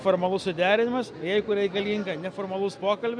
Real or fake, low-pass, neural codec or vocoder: real; 9.9 kHz; none